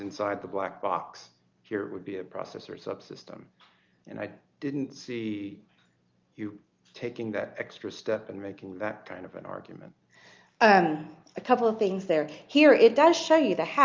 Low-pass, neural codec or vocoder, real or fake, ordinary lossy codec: 7.2 kHz; none; real; Opus, 24 kbps